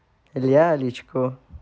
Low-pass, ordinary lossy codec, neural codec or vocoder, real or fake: none; none; none; real